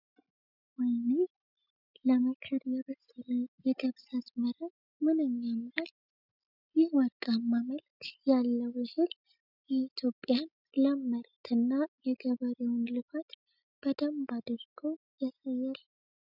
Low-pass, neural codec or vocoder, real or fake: 5.4 kHz; none; real